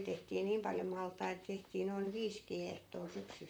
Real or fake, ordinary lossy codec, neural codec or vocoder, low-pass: fake; none; vocoder, 44.1 kHz, 128 mel bands, Pupu-Vocoder; none